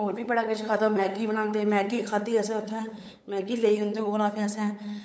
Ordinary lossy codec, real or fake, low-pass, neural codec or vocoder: none; fake; none; codec, 16 kHz, 8 kbps, FunCodec, trained on LibriTTS, 25 frames a second